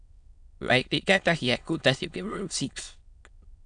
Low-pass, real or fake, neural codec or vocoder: 9.9 kHz; fake; autoencoder, 22.05 kHz, a latent of 192 numbers a frame, VITS, trained on many speakers